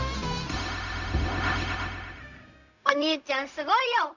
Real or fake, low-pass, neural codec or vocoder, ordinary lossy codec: fake; 7.2 kHz; codec, 16 kHz, 0.4 kbps, LongCat-Audio-Codec; none